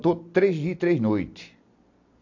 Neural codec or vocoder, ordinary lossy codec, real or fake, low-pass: none; AAC, 48 kbps; real; 7.2 kHz